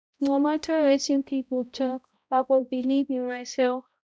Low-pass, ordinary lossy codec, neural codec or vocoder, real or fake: none; none; codec, 16 kHz, 0.5 kbps, X-Codec, HuBERT features, trained on balanced general audio; fake